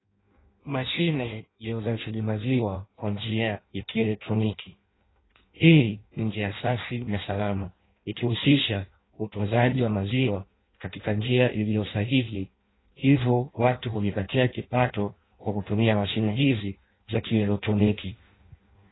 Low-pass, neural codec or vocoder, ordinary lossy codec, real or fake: 7.2 kHz; codec, 16 kHz in and 24 kHz out, 0.6 kbps, FireRedTTS-2 codec; AAC, 16 kbps; fake